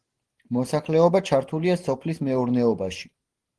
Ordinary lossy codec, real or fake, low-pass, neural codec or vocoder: Opus, 16 kbps; real; 10.8 kHz; none